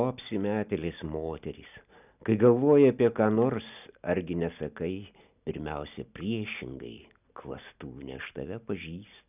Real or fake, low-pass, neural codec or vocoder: real; 3.6 kHz; none